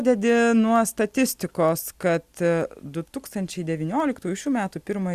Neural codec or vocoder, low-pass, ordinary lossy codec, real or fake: none; 14.4 kHz; Opus, 64 kbps; real